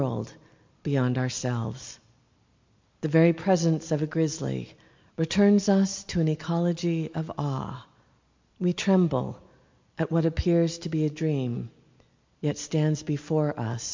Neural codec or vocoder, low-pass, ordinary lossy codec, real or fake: none; 7.2 kHz; MP3, 48 kbps; real